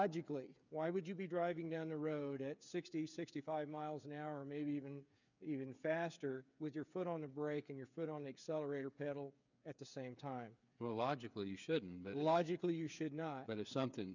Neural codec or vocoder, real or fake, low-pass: codec, 16 kHz, 8 kbps, FreqCodec, smaller model; fake; 7.2 kHz